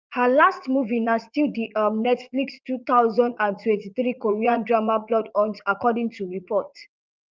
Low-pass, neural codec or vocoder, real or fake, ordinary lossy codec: 7.2 kHz; vocoder, 44.1 kHz, 80 mel bands, Vocos; fake; Opus, 32 kbps